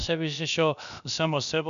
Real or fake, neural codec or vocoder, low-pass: fake; codec, 16 kHz, about 1 kbps, DyCAST, with the encoder's durations; 7.2 kHz